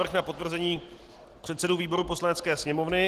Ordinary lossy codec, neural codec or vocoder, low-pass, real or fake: Opus, 16 kbps; none; 14.4 kHz; real